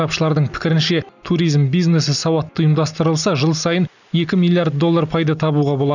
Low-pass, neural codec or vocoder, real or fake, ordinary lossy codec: 7.2 kHz; none; real; none